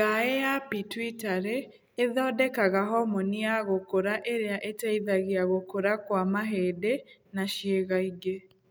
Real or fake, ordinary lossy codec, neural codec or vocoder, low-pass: real; none; none; none